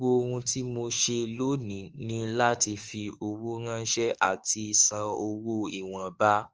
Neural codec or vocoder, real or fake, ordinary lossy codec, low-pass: autoencoder, 48 kHz, 32 numbers a frame, DAC-VAE, trained on Japanese speech; fake; Opus, 24 kbps; 7.2 kHz